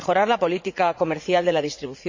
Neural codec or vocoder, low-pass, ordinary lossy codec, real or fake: vocoder, 44.1 kHz, 80 mel bands, Vocos; 7.2 kHz; MP3, 64 kbps; fake